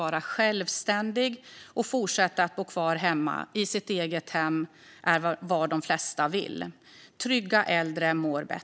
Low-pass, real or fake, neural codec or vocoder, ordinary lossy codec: none; real; none; none